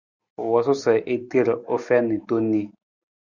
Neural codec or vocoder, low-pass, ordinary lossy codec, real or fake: none; 7.2 kHz; Opus, 64 kbps; real